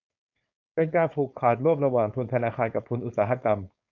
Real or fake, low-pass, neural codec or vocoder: fake; 7.2 kHz; codec, 16 kHz, 4.8 kbps, FACodec